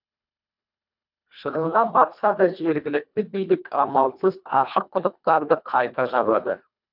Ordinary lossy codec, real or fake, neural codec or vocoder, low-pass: none; fake; codec, 24 kHz, 1.5 kbps, HILCodec; 5.4 kHz